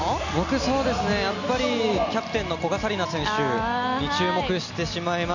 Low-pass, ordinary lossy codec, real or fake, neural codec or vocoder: 7.2 kHz; none; real; none